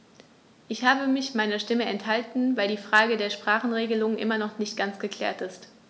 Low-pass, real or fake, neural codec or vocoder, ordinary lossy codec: none; real; none; none